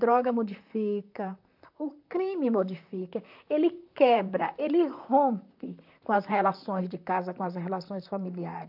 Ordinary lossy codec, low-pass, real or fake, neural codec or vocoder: AAC, 48 kbps; 5.4 kHz; fake; vocoder, 44.1 kHz, 128 mel bands, Pupu-Vocoder